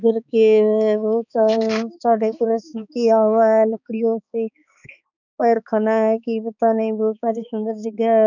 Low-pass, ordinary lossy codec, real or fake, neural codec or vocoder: 7.2 kHz; none; fake; codec, 16 kHz, 4 kbps, X-Codec, HuBERT features, trained on balanced general audio